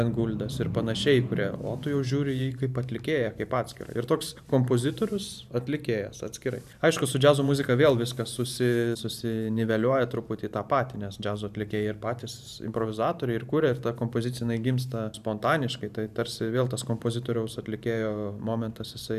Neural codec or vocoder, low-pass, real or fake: vocoder, 44.1 kHz, 128 mel bands every 512 samples, BigVGAN v2; 14.4 kHz; fake